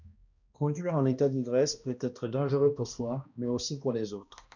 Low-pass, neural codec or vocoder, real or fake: 7.2 kHz; codec, 16 kHz, 1 kbps, X-Codec, HuBERT features, trained on balanced general audio; fake